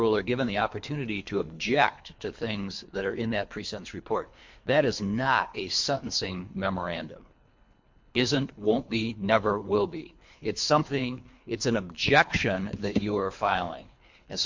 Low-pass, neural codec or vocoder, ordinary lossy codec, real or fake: 7.2 kHz; codec, 24 kHz, 3 kbps, HILCodec; MP3, 48 kbps; fake